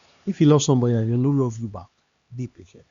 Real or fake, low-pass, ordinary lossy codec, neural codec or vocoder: fake; 7.2 kHz; Opus, 64 kbps; codec, 16 kHz, 2 kbps, X-Codec, HuBERT features, trained on LibriSpeech